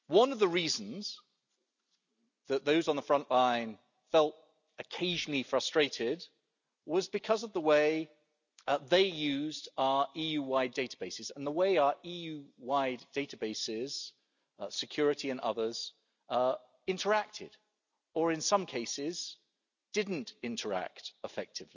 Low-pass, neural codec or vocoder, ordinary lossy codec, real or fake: 7.2 kHz; none; none; real